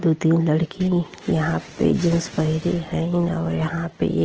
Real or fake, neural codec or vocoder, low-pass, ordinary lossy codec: real; none; 7.2 kHz; Opus, 16 kbps